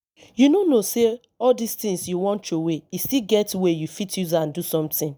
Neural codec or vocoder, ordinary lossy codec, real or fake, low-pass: none; none; real; none